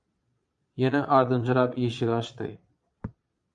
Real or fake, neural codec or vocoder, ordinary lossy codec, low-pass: fake; vocoder, 22.05 kHz, 80 mel bands, Vocos; MP3, 64 kbps; 9.9 kHz